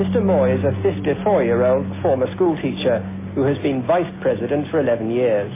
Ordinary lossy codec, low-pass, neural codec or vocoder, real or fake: MP3, 16 kbps; 3.6 kHz; none; real